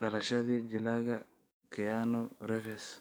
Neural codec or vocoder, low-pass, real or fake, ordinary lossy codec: codec, 44.1 kHz, 7.8 kbps, DAC; none; fake; none